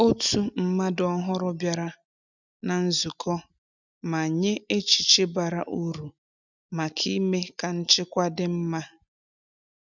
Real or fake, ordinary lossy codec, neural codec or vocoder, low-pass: real; none; none; 7.2 kHz